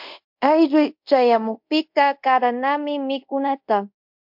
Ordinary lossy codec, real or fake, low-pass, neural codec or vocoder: MP3, 48 kbps; fake; 5.4 kHz; codec, 24 kHz, 0.5 kbps, DualCodec